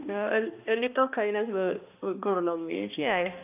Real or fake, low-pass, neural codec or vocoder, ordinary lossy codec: fake; 3.6 kHz; codec, 16 kHz, 1 kbps, X-Codec, HuBERT features, trained on balanced general audio; AAC, 32 kbps